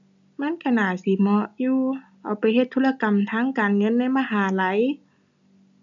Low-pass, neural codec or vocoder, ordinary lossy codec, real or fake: 7.2 kHz; none; none; real